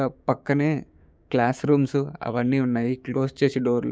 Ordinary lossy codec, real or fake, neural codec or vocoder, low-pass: none; fake; codec, 16 kHz, 6 kbps, DAC; none